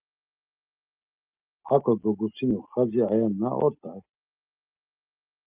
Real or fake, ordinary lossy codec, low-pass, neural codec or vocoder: real; Opus, 32 kbps; 3.6 kHz; none